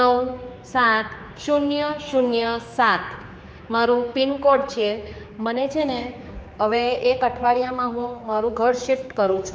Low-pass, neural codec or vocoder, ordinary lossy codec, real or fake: none; codec, 16 kHz, 4 kbps, X-Codec, HuBERT features, trained on general audio; none; fake